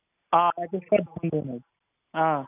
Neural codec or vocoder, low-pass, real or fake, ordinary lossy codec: none; 3.6 kHz; real; none